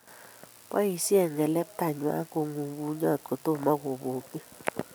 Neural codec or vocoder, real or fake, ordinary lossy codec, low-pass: none; real; none; none